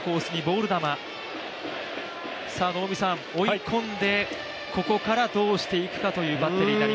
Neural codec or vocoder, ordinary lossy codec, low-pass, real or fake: none; none; none; real